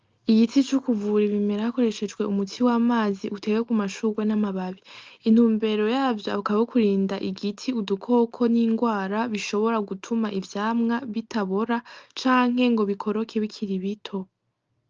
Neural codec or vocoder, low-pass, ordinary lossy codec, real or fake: none; 7.2 kHz; Opus, 24 kbps; real